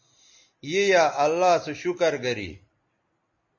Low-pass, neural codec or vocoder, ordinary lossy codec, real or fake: 7.2 kHz; none; MP3, 32 kbps; real